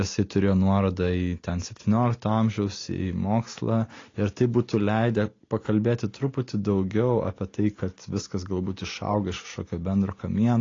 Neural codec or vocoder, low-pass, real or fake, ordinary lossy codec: none; 7.2 kHz; real; AAC, 32 kbps